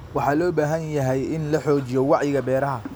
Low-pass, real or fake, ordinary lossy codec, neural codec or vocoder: none; real; none; none